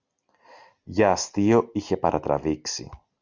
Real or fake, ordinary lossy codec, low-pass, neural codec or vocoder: real; Opus, 64 kbps; 7.2 kHz; none